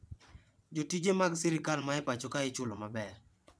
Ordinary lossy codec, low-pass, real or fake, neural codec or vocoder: none; none; fake; vocoder, 22.05 kHz, 80 mel bands, WaveNeXt